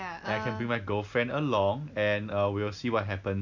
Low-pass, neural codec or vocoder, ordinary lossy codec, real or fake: 7.2 kHz; none; none; real